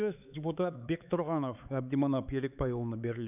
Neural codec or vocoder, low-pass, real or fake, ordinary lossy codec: codec, 16 kHz, 4 kbps, X-Codec, HuBERT features, trained on LibriSpeech; 3.6 kHz; fake; none